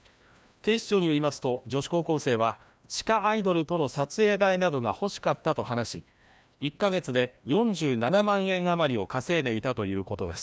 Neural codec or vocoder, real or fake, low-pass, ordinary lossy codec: codec, 16 kHz, 1 kbps, FreqCodec, larger model; fake; none; none